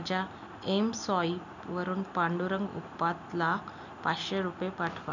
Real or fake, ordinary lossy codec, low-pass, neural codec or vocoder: real; none; 7.2 kHz; none